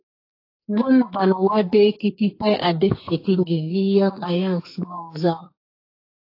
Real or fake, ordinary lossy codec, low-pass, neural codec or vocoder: fake; AAC, 32 kbps; 5.4 kHz; codec, 44.1 kHz, 2.6 kbps, SNAC